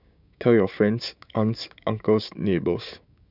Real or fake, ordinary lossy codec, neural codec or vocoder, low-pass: fake; none; codec, 16 kHz, 6 kbps, DAC; 5.4 kHz